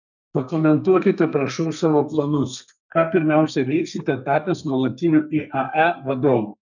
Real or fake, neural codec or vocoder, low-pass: fake; codec, 32 kHz, 1.9 kbps, SNAC; 7.2 kHz